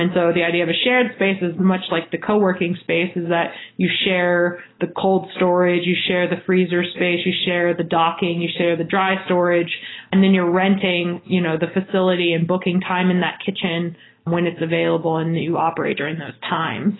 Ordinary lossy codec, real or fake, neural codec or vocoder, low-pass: AAC, 16 kbps; real; none; 7.2 kHz